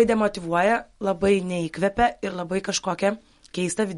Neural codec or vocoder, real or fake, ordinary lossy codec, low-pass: none; real; MP3, 48 kbps; 19.8 kHz